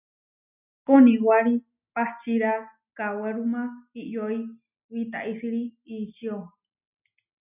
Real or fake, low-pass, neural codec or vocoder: real; 3.6 kHz; none